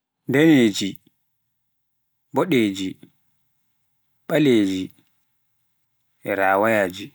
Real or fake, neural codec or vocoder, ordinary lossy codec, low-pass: real; none; none; none